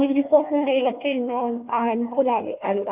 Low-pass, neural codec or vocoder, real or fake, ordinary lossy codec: 3.6 kHz; codec, 16 kHz, 1 kbps, FunCodec, trained on Chinese and English, 50 frames a second; fake; none